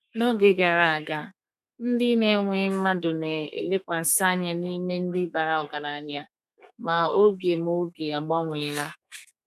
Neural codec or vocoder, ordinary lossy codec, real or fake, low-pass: codec, 32 kHz, 1.9 kbps, SNAC; none; fake; 14.4 kHz